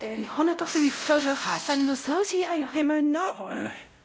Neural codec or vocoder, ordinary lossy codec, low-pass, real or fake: codec, 16 kHz, 0.5 kbps, X-Codec, WavLM features, trained on Multilingual LibriSpeech; none; none; fake